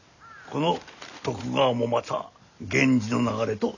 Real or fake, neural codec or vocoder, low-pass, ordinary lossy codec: real; none; 7.2 kHz; none